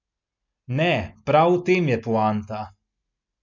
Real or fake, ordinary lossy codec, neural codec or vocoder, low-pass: real; none; none; 7.2 kHz